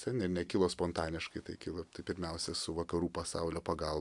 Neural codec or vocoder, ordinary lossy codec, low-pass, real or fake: none; MP3, 96 kbps; 10.8 kHz; real